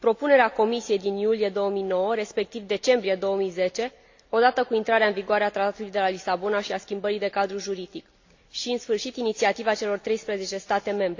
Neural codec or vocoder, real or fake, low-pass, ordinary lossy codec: none; real; 7.2 kHz; AAC, 48 kbps